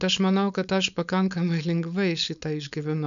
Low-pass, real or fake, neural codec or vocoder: 7.2 kHz; fake; codec, 16 kHz, 4.8 kbps, FACodec